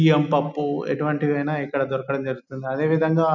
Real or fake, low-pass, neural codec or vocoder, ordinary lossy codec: real; 7.2 kHz; none; none